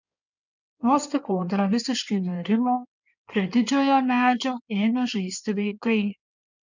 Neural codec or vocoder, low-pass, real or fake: codec, 16 kHz in and 24 kHz out, 2.2 kbps, FireRedTTS-2 codec; 7.2 kHz; fake